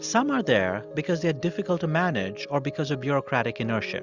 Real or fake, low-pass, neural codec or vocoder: real; 7.2 kHz; none